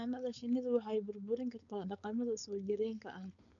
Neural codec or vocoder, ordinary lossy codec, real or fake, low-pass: codec, 16 kHz, 4.8 kbps, FACodec; none; fake; 7.2 kHz